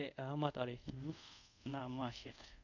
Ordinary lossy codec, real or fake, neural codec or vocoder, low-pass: none; fake; codec, 24 kHz, 0.5 kbps, DualCodec; 7.2 kHz